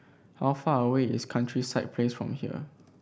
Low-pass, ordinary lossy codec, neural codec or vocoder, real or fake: none; none; none; real